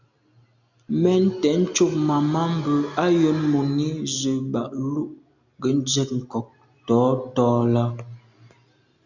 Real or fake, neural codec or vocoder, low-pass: real; none; 7.2 kHz